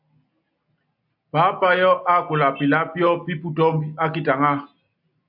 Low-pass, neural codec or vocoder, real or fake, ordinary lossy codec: 5.4 kHz; none; real; AAC, 48 kbps